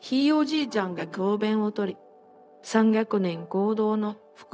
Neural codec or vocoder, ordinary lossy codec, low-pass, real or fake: codec, 16 kHz, 0.4 kbps, LongCat-Audio-Codec; none; none; fake